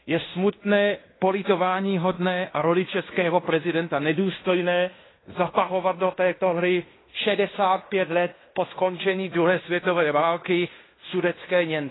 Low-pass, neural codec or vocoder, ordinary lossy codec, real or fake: 7.2 kHz; codec, 16 kHz in and 24 kHz out, 0.9 kbps, LongCat-Audio-Codec, fine tuned four codebook decoder; AAC, 16 kbps; fake